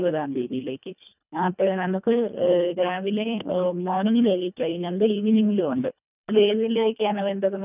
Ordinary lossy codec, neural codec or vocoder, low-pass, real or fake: none; codec, 24 kHz, 1.5 kbps, HILCodec; 3.6 kHz; fake